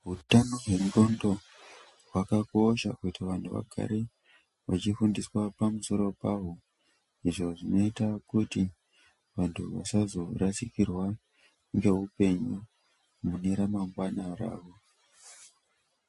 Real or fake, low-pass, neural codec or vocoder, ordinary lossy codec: real; 14.4 kHz; none; MP3, 48 kbps